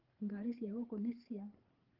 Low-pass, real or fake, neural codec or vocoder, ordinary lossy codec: 5.4 kHz; fake; codec, 16 kHz, 4 kbps, FreqCodec, larger model; Opus, 16 kbps